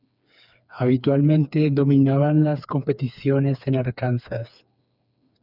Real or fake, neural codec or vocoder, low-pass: fake; codec, 16 kHz, 4 kbps, FreqCodec, smaller model; 5.4 kHz